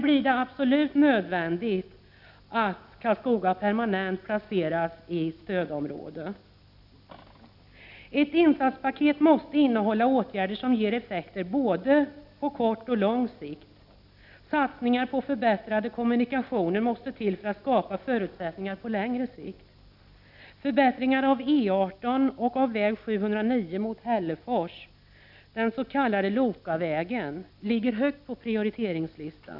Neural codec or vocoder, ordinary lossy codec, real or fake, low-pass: none; none; real; 5.4 kHz